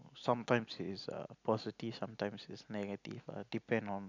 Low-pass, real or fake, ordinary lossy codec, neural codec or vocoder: 7.2 kHz; real; none; none